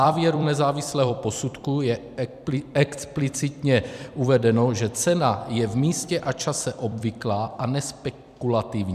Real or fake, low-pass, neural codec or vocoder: fake; 14.4 kHz; vocoder, 44.1 kHz, 128 mel bands every 256 samples, BigVGAN v2